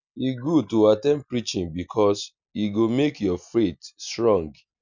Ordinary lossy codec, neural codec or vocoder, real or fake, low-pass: none; none; real; 7.2 kHz